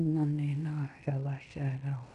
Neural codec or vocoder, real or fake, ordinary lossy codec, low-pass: codec, 16 kHz in and 24 kHz out, 0.9 kbps, LongCat-Audio-Codec, fine tuned four codebook decoder; fake; none; 10.8 kHz